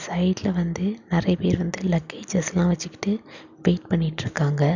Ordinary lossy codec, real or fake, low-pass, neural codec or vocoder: none; real; 7.2 kHz; none